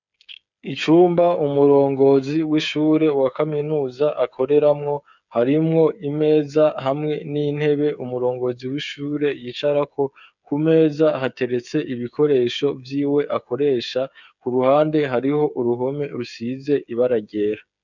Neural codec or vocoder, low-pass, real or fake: codec, 16 kHz, 8 kbps, FreqCodec, smaller model; 7.2 kHz; fake